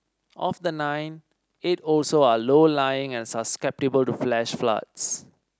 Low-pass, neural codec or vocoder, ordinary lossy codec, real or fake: none; none; none; real